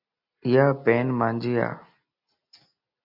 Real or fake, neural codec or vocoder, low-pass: real; none; 5.4 kHz